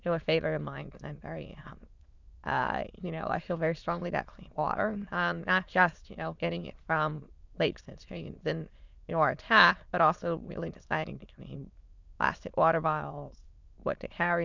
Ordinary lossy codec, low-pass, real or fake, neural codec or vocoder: Opus, 64 kbps; 7.2 kHz; fake; autoencoder, 22.05 kHz, a latent of 192 numbers a frame, VITS, trained on many speakers